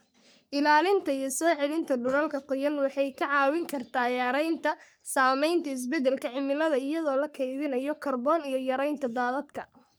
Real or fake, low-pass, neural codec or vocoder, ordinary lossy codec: fake; none; codec, 44.1 kHz, 3.4 kbps, Pupu-Codec; none